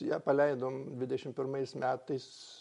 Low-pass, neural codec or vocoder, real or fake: 10.8 kHz; none; real